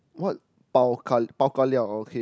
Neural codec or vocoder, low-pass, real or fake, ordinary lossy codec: none; none; real; none